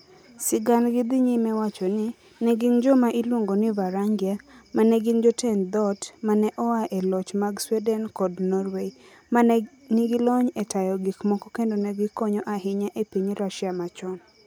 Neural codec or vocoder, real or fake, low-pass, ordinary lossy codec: none; real; none; none